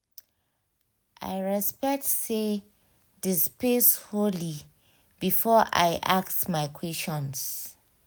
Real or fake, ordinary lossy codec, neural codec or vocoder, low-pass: real; none; none; none